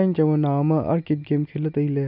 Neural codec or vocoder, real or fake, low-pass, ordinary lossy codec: none; real; 5.4 kHz; none